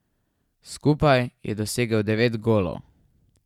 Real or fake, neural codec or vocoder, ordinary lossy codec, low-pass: fake; vocoder, 44.1 kHz, 128 mel bands every 512 samples, BigVGAN v2; none; 19.8 kHz